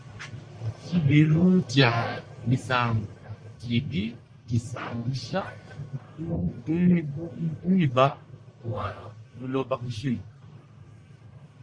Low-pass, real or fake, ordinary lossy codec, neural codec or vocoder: 9.9 kHz; fake; MP3, 64 kbps; codec, 44.1 kHz, 1.7 kbps, Pupu-Codec